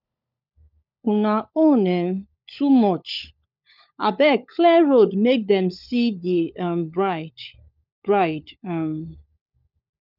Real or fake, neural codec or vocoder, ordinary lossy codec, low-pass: fake; codec, 16 kHz, 16 kbps, FunCodec, trained on LibriTTS, 50 frames a second; none; 5.4 kHz